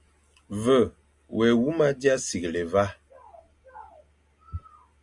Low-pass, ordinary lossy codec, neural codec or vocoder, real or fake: 10.8 kHz; Opus, 64 kbps; none; real